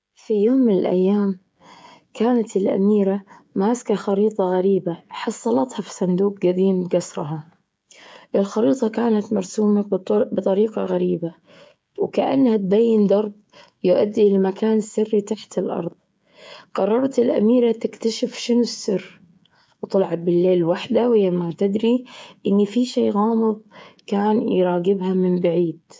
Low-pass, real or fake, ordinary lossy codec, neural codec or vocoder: none; fake; none; codec, 16 kHz, 16 kbps, FreqCodec, smaller model